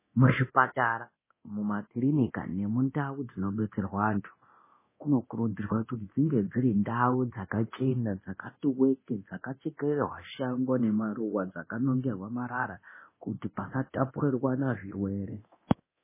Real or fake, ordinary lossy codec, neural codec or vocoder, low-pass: fake; MP3, 16 kbps; codec, 24 kHz, 0.9 kbps, DualCodec; 3.6 kHz